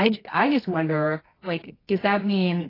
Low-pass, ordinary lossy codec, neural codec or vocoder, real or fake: 5.4 kHz; AAC, 24 kbps; codec, 24 kHz, 0.9 kbps, WavTokenizer, medium music audio release; fake